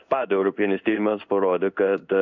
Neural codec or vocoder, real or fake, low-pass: codec, 16 kHz in and 24 kHz out, 1 kbps, XY-Tokenizer; fake; 7.2 kHz